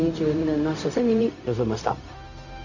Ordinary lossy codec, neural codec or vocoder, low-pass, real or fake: none; codec, 16 kHz, 0.4 kbps, LongCat-Audio-Codec; 7.2 kHz; fake